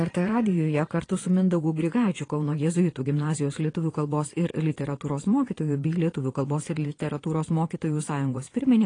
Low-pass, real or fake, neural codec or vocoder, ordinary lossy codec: 9.9 kHz; fake; vocoder, 22.05 kHz, 80 mel bands, Vocos; AAC, 32 kbps